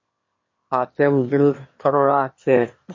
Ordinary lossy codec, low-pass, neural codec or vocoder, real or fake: MP3, 32 kbps; 7.2 kHz; autoencoder, 22.05 kHz, a latent of 192 numbers a frame, VITS, trained on one speaker; fake